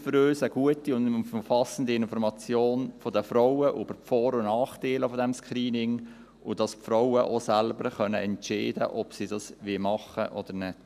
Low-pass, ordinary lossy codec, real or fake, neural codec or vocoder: 14.4 kHz; none; real; none